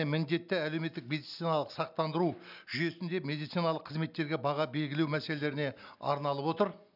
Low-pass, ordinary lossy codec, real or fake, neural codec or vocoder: 5.4 kHz; none; real; none